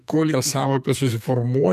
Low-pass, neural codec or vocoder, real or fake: 14.4 kHz; codec, 32 kHz, 1.9 kbps, SNAC; fake